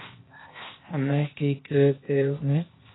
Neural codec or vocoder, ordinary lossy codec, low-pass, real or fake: codec, 16 kHz, 0.8 kbps, ZipCodec; AAC, 16 kbps; 7.2 kHz; fake